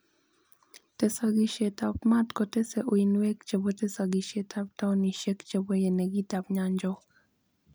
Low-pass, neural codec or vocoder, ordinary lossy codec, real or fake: none; none; none; real